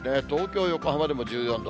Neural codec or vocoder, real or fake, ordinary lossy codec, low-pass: none; real; none; none